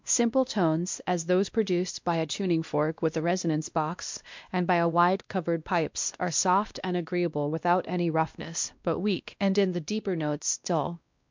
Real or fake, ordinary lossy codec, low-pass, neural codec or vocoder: fake; MP3, 64 kbps; 7.2 kHz; codec, 16 kHz, 1 kbps, X-Codec, WavLM features, trained on Multilingual LibriSpeech